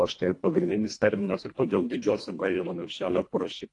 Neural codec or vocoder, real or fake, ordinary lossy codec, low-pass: codec, 24 kHz, 1.5 kbps, HILCodec; fake; AAC, 48 kbps; 10.8 kHz